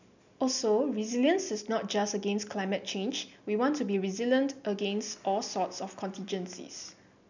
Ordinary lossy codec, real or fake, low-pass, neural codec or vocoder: none; real; 7.2 kHz; none